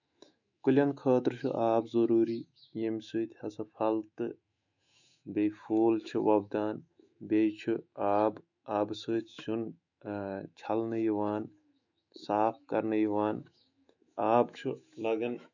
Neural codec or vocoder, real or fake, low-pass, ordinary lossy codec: autoencoder, 48 kHz, 128 numbers a frame, DAC-VAE, trained on Japanese speech; fake; 7.2 kHz; none